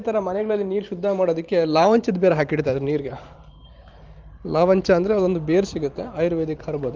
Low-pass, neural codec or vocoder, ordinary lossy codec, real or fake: 7.2 kHz; vocoder, 44.1 kHz, 128 mel bands every 512 samples, BigVGAN v2; Opus, 24 kbps; fake